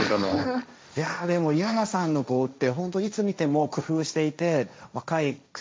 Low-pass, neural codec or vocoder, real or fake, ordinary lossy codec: none; codec, 16 kHz, 1.1 kbps, Voila-Tokenizer; fake; none